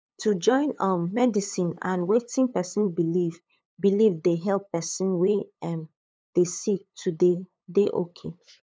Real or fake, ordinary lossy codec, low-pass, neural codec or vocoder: fake; none; none; codec, 16 kHz, 8 kbps, FunCodec, trained on LibriTTS, 25 frames a second